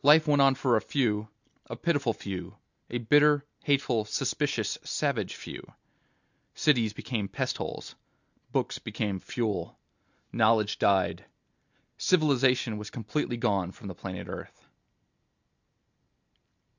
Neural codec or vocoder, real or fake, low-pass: none; real; 7.2 kHz